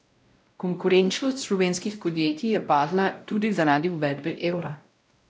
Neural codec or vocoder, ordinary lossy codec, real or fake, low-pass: codec, 16 kHz, 0.5 kbps, X-Codec, WavLM features, trained on Multilingual LibriSpeech; none; fake; none